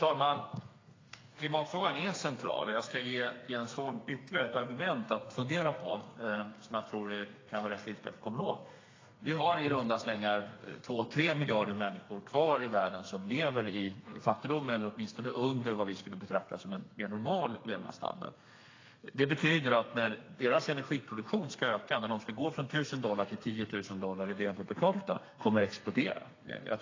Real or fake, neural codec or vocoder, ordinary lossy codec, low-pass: fake; codec, 32 kHz, 1.9 kbps, SNAC; AAC, 32 kbps; 7.2 kHz